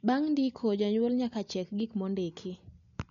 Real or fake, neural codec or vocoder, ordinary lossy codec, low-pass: real; none; none; 7.2 kHz